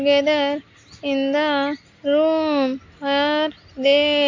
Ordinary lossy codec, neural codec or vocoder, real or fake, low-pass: AAC, 48 kbps; none; real; 7.2 kHz